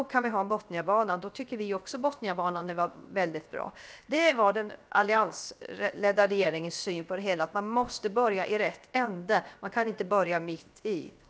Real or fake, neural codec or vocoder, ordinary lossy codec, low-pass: fake; codec, 16 kHz, 0.7 kbps, FocalCodec; none; none